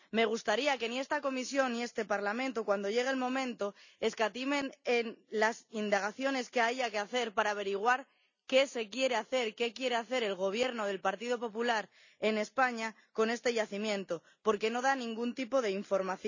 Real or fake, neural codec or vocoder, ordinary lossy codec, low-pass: real; none; none; 7.2 kHz